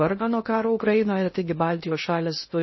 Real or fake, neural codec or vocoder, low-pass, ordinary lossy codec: fake; codec, 16 kHz in and 24 kHz out, 0.6 kbps, FocalCodec, streaming, 2048 codes; 7.2 kHz; MP3, 24 kbps